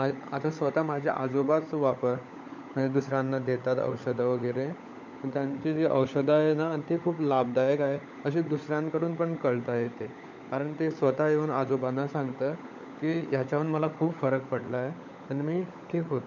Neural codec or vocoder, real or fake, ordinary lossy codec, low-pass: codec, 16 kHz, 16 kbps, FunCodec, trained on LibriTTS, 50 frames a second; fake; none; 7.2 kHz